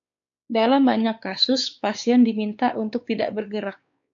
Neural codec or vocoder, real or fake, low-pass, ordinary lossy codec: codec, 16 kHz, 4 kbps, X-Codec, WavLM features, trained on Multilingual LibriSpeech; fake; 7.2 kHz; AAC, 64 kbps